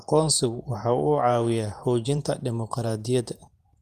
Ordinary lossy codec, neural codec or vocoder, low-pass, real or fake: Opus, 32 kbps; vocoder, 48 kHz, 128 mel bands, Vocos; 14.4 kHz; fake